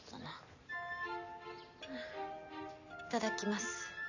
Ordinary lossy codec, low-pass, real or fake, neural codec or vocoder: none; 7.2 kHz; real; none